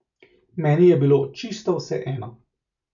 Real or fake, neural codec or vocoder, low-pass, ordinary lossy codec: real; none; 7.2 kHz; none